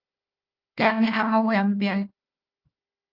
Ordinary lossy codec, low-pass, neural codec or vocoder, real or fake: Opus, 24 kbps; 5.4 kHz; codec, 16 kHz, 1 kbps, FunCodec, trained on Chinese and English, 50 frames a second; fake